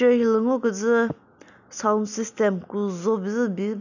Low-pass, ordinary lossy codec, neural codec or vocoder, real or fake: 7.2 kHz; none; none; real